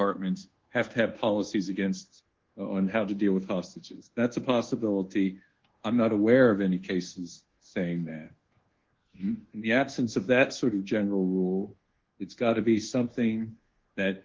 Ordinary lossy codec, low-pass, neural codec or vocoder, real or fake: Opus, 16 kbps; 7.2 kHz; codec, 24 kHz, 1.2 kbps, DualCodec; fake